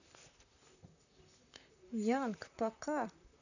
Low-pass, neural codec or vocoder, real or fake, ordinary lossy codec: 7.2 kHz; vocoder, 44.1 kHz, 128 mel bands, Pupu-Vocoder; fake; none